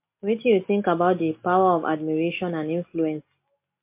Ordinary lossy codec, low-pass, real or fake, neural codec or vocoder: MP3, 24 kbps; 3.6 kHz; real; none